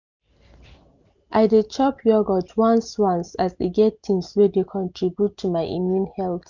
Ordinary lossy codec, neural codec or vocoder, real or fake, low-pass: none; none; real; 7.2 kHz